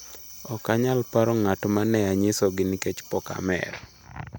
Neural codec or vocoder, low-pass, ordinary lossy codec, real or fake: none; none; none; real